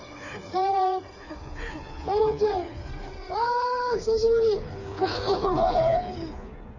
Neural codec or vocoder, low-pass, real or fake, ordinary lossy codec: codec, 16 kHz, 4 kbps, FreqCodec, smaller model; 7.2 kHz; fake; none